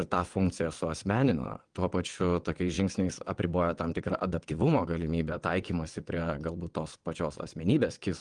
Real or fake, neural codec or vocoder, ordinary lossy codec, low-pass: fake; vocoder, 22.05 kHz, 80 mel bands, WaveNeXt; Opus, 24 kbps; 9.9 kHz